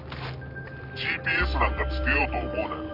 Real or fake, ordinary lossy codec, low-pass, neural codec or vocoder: real; none; 5.4 kHz; none